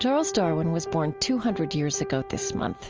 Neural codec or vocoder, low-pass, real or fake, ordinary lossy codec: none; 7.2 kHz; real; Opus, 24 kbps